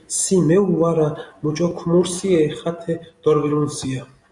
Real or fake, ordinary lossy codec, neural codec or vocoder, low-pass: real; Opus, 64 kbps; none; 10.8 kHz